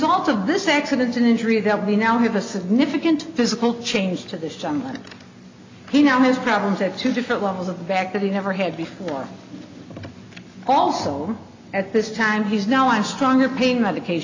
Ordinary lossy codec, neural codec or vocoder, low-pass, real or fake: MP3, 64 kbps; none; 7.2 kHz; real